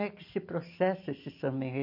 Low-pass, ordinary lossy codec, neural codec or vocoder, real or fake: 5.4 kHz; MP3, 32 kbps; none; real